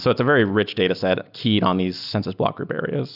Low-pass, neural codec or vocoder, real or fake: 5.4 kHz; none; real